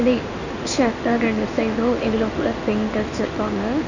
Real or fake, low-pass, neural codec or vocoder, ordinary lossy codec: fake; 7.2 kHz; codec, 16 kHz in and 24 kHz out, 1 kbps, XY-Tokenizer; none